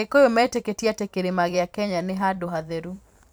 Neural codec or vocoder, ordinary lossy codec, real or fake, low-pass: vocoder, 44.1 kHz, 128 mel bands every 512 samples, BigVGAN v2; none; fake; none